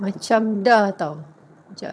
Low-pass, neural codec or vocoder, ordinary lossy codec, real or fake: none; vocoder, 22.05 kHz, 80 mel bands, HiFi-GAN; none; fake